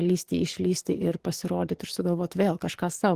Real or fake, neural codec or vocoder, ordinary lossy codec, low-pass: fake; codec, 44.1 kHz, 7.8 kbps, DAC; Opus, 16 kbps; 14.4 kHz